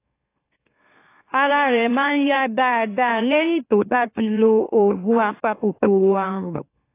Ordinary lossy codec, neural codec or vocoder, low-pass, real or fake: AAC, 24 kbps; autoencoder, 44.1 kHz, a latent of 192 numbers a frame, MeloTTS; 3.6 kHz; fake